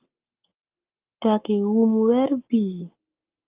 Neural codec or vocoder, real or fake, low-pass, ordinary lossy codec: codec, 44.1 kHz, 7.8 kbps, Pupu-Codec; fake; 3.6 kHz; Opus, 24 kbps